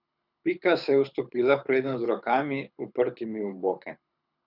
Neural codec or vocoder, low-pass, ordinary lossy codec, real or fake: codec, 24 kHz, 6 kbps, HILCodec; 5.4 kHz; none; fake